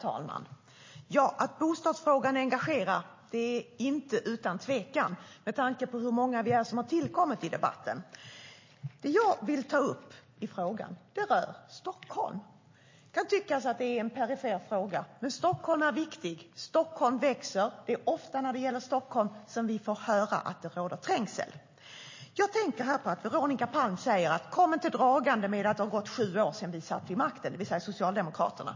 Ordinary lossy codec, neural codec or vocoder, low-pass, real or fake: MP3, 32 kbps; none; 7.2 kHz; real